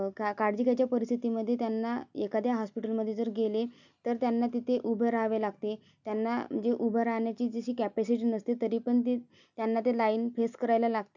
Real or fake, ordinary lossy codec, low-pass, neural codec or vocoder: real; none; 7.2 kHz; none